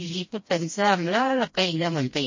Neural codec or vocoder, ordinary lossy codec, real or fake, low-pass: codec, 16 kHz, 1 kbps, FreqCodec, smaller model; MP3, 32 kbps; fake; 7.2 kHz